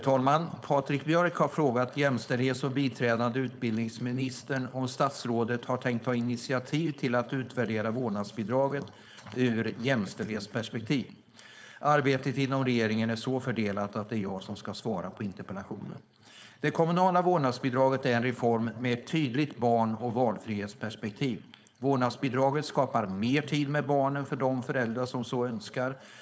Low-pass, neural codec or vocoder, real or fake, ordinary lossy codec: none; codec, 16 kHz, 4.8 kbps, FACodec; fake; none